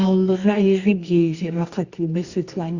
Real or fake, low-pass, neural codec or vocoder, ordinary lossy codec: fake; 7.2 kHz; codec, 24 kHz, 0.9 kbps, WavTokenizer, medium music audio release; Opus, 64 kbps